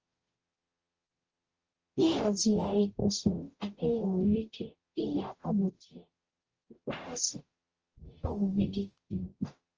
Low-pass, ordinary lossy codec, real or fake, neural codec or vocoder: 7.2 kHz; Opus, 24 kbps; fake; codec, 44.1 kHz, 0.9 kbps, DAC